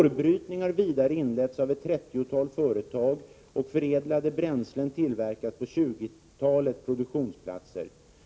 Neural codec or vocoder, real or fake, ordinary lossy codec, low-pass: none; real; none; none